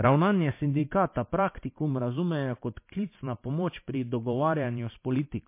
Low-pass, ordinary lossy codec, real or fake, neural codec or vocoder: 3.6 kHz; MP3, 24 kbps; fake; vocoder, 44.1 kHz, 128 mel bands every 512 samples, BigVGAN v2